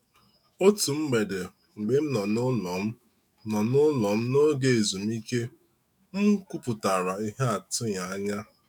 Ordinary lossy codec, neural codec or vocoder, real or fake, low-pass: none; autoencoder, 48 kHz, 128 numbers a frame, DAC-VAE, trained on Japanese speech; fake; none